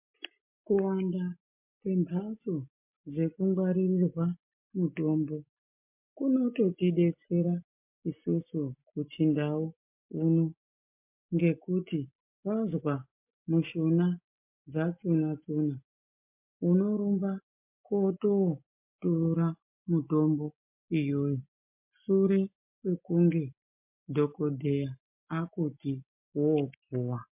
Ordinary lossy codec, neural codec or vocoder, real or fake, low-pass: MP3, 32 kbps; none; real; 3.6 kHz